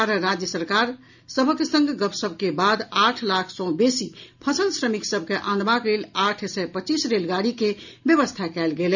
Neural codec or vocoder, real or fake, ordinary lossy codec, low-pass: none; real; none; 7.2 kHz